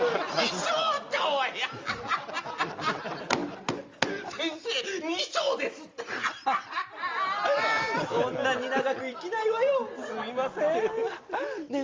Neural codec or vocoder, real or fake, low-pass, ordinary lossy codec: none; real; 7.2 kHz; Opus, 32 kbps